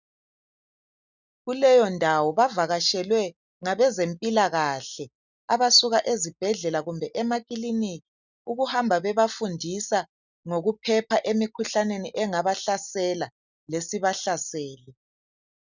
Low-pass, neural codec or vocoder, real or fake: 7.2 kHz; none; real